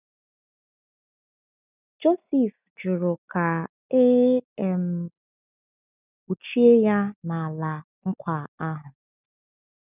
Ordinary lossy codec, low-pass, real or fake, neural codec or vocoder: none; 3.6 kHz; real; none